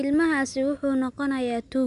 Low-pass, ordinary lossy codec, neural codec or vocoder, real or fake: 10.8 kHz; MP3, 96 kbps; none; real